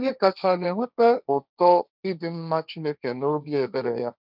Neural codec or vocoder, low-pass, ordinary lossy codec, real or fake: codec, 16 kHz, 1.1 kbps, Voila-Tokenizer; 5.4 kHz; none; fake